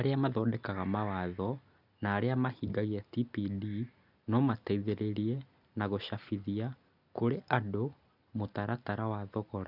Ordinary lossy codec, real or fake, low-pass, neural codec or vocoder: none; real; 5.4 kHz; none